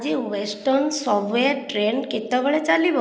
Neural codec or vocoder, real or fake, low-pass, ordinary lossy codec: none; real; none; none